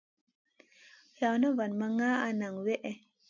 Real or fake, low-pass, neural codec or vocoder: real; 7.2 kHz; none